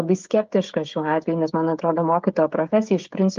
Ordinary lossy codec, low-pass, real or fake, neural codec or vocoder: Opus, 32 kbps; 7.2 kHz; fake; codec, 16 kHz, 16 kbps, FreqCodec, smaller model